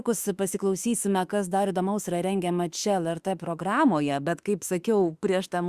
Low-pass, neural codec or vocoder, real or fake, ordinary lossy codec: 14.4 kHz; autoencoder, 48 kHz, 32 numbers a frame, DAC-VAE, trained on Japanese speech; fake; Opus, 64 kbps